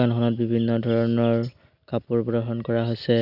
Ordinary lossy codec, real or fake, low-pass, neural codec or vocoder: none; real; 5.4 kHz; none